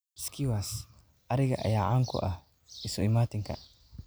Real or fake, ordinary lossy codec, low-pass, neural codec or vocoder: real; none; none; none